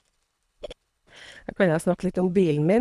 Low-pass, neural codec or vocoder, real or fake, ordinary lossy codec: none; codec, 24 kHz, 3 kbps, HILCodec; fake; none